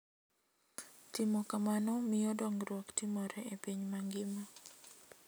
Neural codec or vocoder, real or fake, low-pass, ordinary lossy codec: none; real; none; none